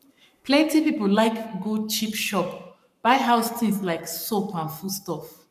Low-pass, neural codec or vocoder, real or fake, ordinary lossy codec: 14.4 kHz; codec, 44.1 kHz, 7.8 kbps, Pupu-Codec; fake; none